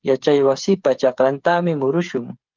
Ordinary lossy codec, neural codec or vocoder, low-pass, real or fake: Opus, 24 kbps; codec, 16 kHz, 8 kbps, FreqCodec, smaller model; 7.2 kHz; fake